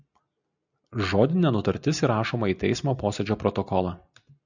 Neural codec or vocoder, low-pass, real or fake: none; 7.2 kHz; real